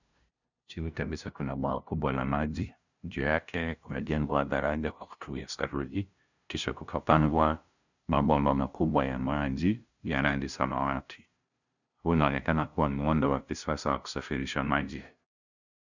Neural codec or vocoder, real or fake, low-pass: codec, 16 kHz, 0.5 kbps, FunCodec, trained on LibriTTS, 25 frames a second; fake; 7.2 kHz